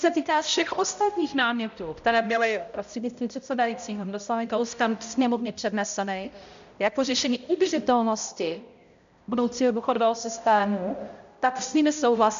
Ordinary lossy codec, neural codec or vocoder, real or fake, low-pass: MP3, 64 kbps; codec, 16 kHz, 0.5 kbps, X-Codec, HuBERT features, trained on balanced general audio; fake; 7.2 kHz